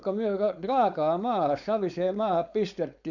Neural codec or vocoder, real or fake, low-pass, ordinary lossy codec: codec, 16 kHz, 4.8 kbps, FACodec; fake; 7.2 kHz; none